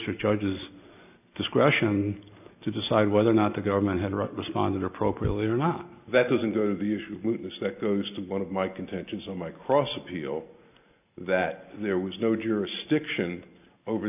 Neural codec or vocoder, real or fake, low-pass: none; real; 3.6 kHz